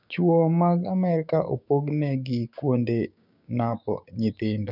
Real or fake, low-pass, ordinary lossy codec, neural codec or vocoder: fake; 5.4 kHz; none; codec, 16 kHz, 6 kbps, DAC